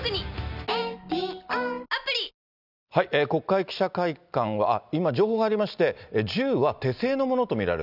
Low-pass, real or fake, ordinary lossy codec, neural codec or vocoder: 5.4 kHz; real; none; none